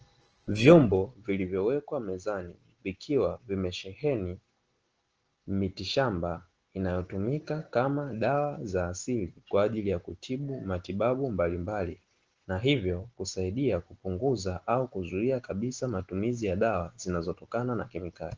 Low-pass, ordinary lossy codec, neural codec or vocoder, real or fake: 7.2 kHz; Opus, 16 kbps; none; real